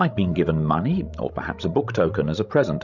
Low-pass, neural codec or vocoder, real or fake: 7.2 kHz; codec, 16 kHz, 16 kbps, FreqCodec, larger model; fake